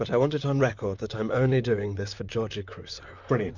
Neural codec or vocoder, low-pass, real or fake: vocoder, 44.1 kHz, 128 mel bands, Pupu-Vocoder; 7.2 kHz; fake